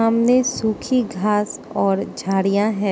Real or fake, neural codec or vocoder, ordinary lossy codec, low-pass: real; none; none; none